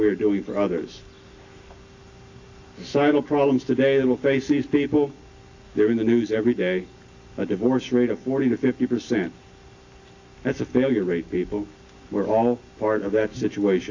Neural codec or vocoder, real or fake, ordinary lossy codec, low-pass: none; real; AAC, 48 kbps; 7.2 kHz